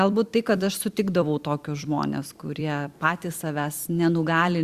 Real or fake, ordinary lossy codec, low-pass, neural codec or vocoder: fake; Opus, 64 kbps; 14.4 kHz; vocoder, 44.1 kHz, 128 mel bands every 256 samples, BigVGAN v2